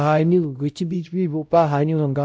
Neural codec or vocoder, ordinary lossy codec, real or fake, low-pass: codec, 16 kHz, 0.5 kbps, X-Codec, WavLM features, trained on Multilingual LibriSpeech; none; fake; none